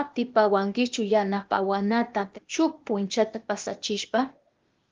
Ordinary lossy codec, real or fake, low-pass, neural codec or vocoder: Opus, 32 kbps; fake; 7.2 kHz; codec, 16 kHz, 0.8 kbps, ZipCodec